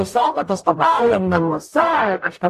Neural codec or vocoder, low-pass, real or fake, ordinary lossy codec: codec, 44.1 kHz, 0.9 kbps, DAC; 14.4 kHz; fake; AAC, 64 kbps